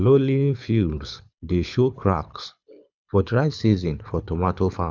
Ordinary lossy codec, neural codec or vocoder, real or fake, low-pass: none; codec, 24 kHz, 6 kbps, HILCodec; fake; 7.2 kHz